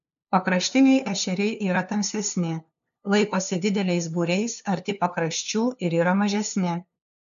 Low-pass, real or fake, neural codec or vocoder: 7.2 kHz; fake; codec, 16 kHz, 2 kbps, FunCodec, trained on LibriTTS, 25 frames a second